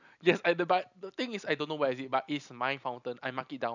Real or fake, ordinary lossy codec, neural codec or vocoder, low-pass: real; none; none; 7.2 kHz